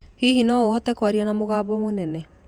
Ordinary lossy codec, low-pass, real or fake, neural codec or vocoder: Opus, 64 kbps; 19.8 kHz; fake; vocoder, 48 kHz, 128 mel bands, Vocos